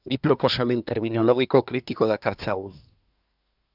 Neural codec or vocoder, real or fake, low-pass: codec, 24 kHz, 1 kbps, SNAC; fake; 5.4 kHz